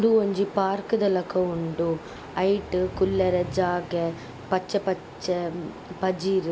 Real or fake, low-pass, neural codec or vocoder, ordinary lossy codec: real; none; none; none